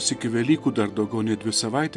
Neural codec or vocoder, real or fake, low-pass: none; real; 10.8 kHz